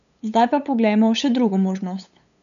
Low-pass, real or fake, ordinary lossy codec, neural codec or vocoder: 7.2 kHz; fake; none; codec, 16 kHz, 8 kbps, FunCodec, trained on LibriTTS, 25 frames a second